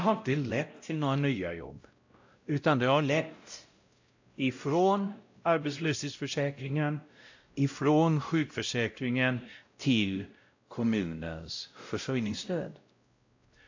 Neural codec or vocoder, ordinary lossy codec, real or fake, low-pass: codec, 16 kHz, 0.5 kbps, X-Codec, WavLM features, trained on Multilingual LibriSpeech; AAC, 48 kbps; fake; 7.2 kHz